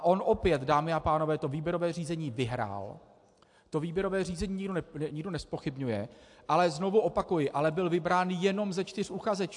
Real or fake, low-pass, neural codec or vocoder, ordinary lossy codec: real; 10.8 kHz; none; AAC, 64 kbps